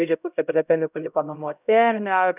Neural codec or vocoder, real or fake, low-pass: codec, 16 kHz, 0.5 kbps, X-Codec, HuBERT features, trained on LibriSpeech; fake; 3.6 kHz